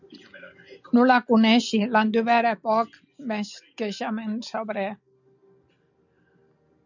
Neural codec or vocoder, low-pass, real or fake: none; 7.2 kHz; real